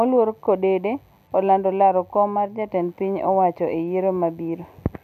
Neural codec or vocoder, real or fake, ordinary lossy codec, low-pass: none; real; none; 14.4 kHz